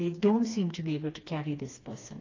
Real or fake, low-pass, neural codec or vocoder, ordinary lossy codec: fake; 7.2 kHz; codec, 16 kHz, 2 kbps, FreqCodec, smaller model; AAC, 32 kbps